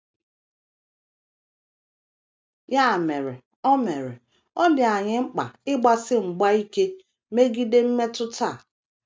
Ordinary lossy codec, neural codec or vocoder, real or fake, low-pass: none; none; real; none